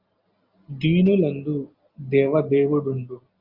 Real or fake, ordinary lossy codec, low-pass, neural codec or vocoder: real; Opus, 32 kbps; 5.4 kHz; none